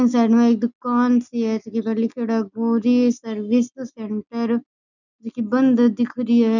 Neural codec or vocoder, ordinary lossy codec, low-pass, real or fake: none; none; 7.2 kHz; real